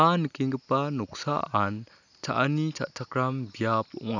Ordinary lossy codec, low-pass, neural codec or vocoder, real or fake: none; 7.2 kHz; none; real